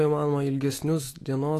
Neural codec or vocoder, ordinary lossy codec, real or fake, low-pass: autoencoder, 48 kHz, 128 numbers a frame, DAC-VAE, trained on Japanese speech; AAC, 48 kbps; fake; 14.4 kHz